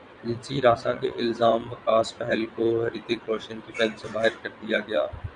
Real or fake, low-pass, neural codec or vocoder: fake; 9.9 kHz; vocoder, 22.05 kHz, 80 mel bands, WaveNeXt